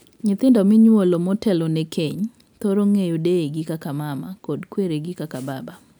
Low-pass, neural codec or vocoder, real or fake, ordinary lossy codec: none; none; real; none